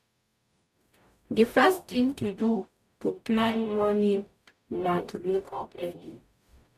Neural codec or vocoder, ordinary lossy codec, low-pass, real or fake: codec, 44.1 kHz, 0.9 kbps, DAC; none; 14.4 kHz; fake